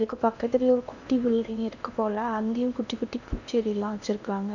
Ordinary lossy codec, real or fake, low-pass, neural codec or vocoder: none; fake; 7.2 kHz; codec, 16 kHz in and 24 kHz out, 0.8 kbps, FocalCodec, streaming, 65536 codes